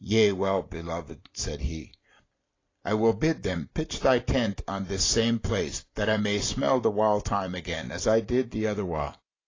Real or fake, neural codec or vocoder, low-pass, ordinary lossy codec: real; none; 7.2 kHz; AAC, 32 kbps